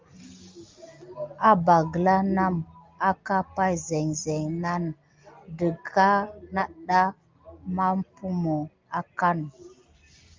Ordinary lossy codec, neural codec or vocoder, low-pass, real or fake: Opus, 24 kbps; none; 7.2 kHz; real